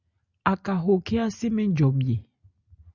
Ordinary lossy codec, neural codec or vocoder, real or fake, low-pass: Opus, 64 kbps; none; real; 7.2 kHz